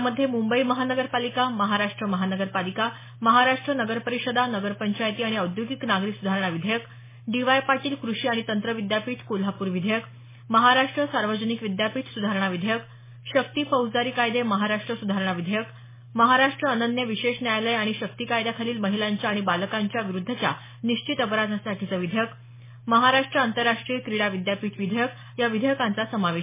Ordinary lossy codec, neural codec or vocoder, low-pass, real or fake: MP3, 16 kbps; none; 3.6 kHz; real